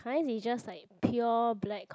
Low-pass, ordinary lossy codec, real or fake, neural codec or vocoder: none; none; real; none